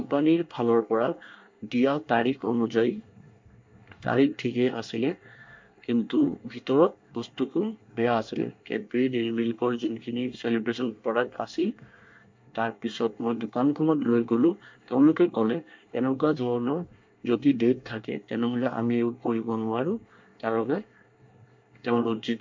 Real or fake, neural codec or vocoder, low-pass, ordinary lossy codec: fake; codec, 24 kHz, 1 kbps, SNAC; 7.2 kHz; MP3, 48 kbps